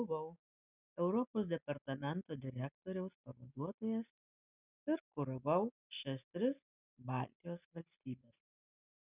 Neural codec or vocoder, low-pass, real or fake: none; 3.6 kHz; real